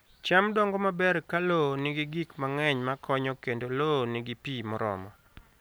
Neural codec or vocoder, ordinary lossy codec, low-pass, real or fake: none; none; none; real